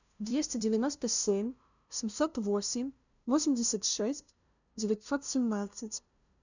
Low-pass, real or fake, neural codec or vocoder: 7.2 kHz; fake; codec, 16 kHz, 0.5 kbps, FunCodec, trained on LibriTTS, 25 frames a second